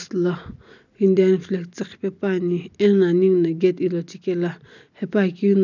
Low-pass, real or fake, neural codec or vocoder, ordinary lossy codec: 7.2 kHz; real; none; none